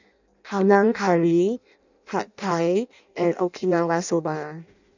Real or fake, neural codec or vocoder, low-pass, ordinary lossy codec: fake; codec, 16 kHz in and 24 kHz out, 0.6 kbps, FireRedTTS-2 codec; 7.2 kHz; none